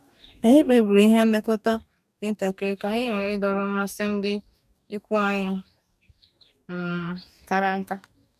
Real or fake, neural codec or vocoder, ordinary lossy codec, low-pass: fake; codec, 44.1 kHz, 2.6 kbps, DAC; none; 14.4 kHz